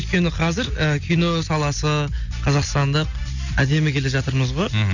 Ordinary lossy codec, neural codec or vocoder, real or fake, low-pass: none; none; real; 7.2 kHz